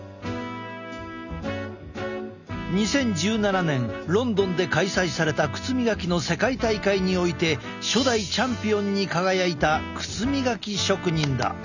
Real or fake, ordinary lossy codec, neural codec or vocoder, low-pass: real; none; none; 7.2 kHz